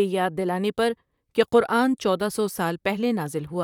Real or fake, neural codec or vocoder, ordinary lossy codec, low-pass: fake; vocoder, 44.1 kHz, 128 mel bands, Pupu-Vocoder; none; 19.8 kHz